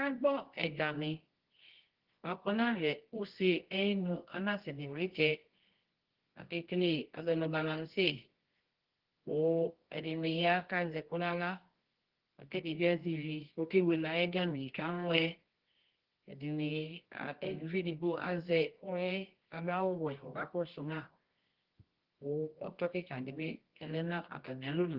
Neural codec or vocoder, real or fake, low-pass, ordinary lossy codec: codec, 24 kHz, 0.9 kbps, WavTokenizer, medium music audio release; fake; 5.4 kHz; Opus, 16 kbps